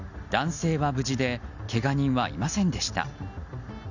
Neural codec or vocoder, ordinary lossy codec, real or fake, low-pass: none; none; real; 7.2 kHz